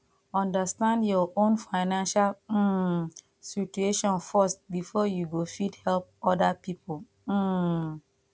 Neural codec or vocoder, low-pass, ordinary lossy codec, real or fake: none; none; none; real